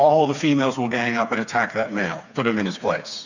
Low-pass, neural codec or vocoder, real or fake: 7.2 kHz; codec, 16 kHz, 4 kbps, FreqCodec, smaller model; fake